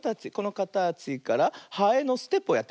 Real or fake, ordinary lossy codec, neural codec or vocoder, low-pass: real; none; none; none